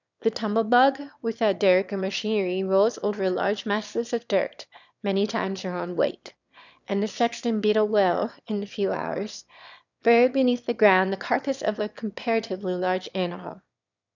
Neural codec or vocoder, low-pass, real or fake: autoencoder, 22.05 kHz, a latent of 192 numbers a frame, VITS, trained on one speaker; 7.2 kHz; fake